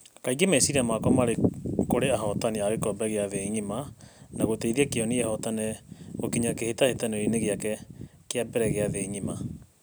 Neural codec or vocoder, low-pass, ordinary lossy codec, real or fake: none; none; none; real